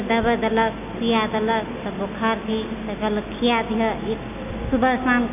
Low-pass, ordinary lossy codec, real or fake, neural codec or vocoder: 3.6 kHz; none; real; none